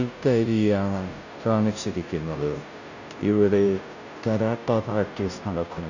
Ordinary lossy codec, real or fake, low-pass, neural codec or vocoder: none; fake; 7.2 kHz; codec, 16 kHz, 0.5 kbps, FunCodec, trained on Chinese and English, 25 frames a second